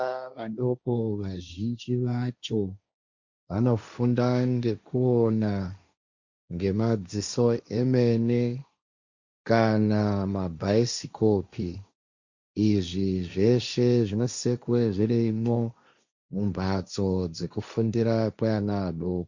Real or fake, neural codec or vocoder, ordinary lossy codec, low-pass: fake; codec, 16 kHz, 1.1 kbps, Voila-Tokenizer; Opus, 64 kbps; 7.2 kHz